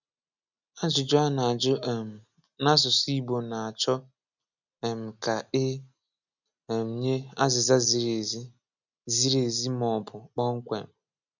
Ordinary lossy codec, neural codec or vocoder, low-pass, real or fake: none; none; 7.2 kHz; real